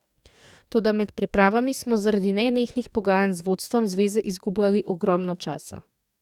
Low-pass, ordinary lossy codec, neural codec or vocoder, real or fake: 19.8 kHz; none; codec, 44.1 kHz, 2.6 kbps, DAC; fake